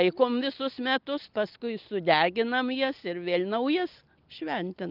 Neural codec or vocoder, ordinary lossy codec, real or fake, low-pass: none; Opus, 24 kbps; real; 5.4 kHz